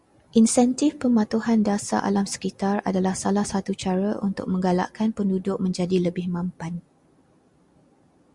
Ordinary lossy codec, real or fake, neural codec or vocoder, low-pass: Opus, 64 kbps; real; none; 10.8 kHz